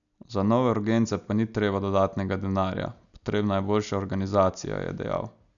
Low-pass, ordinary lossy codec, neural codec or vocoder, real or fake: 7.2 kHz; none; none; real